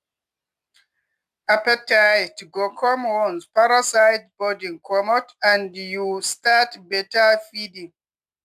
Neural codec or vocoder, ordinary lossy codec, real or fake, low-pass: none; AAC, 96 kbps; real; 14.4 kHz